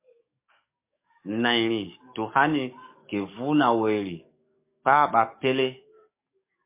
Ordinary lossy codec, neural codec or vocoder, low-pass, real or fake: MP3, 24 kbps; codec, 44.1 kHz, 7.8 kbps, DAC; 3.6 kHz; fake